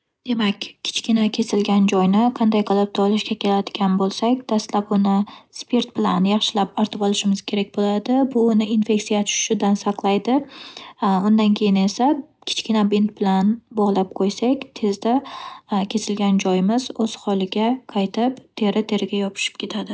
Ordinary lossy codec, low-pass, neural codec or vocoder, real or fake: none; none; none; real